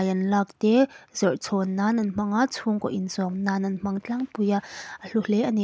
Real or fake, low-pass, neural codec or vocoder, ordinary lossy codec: real; none; none; none